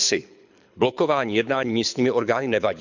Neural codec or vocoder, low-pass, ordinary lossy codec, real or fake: codec, 24 kHz, 6 kbps, HILCodec; 7.2 kHz; none; fake